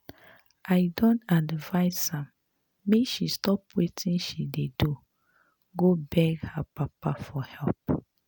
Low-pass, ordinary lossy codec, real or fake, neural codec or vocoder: none; none; real; none